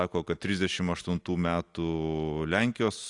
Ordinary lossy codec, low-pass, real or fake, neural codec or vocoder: AAC, 48 kbps; 10.8 kHz; real; none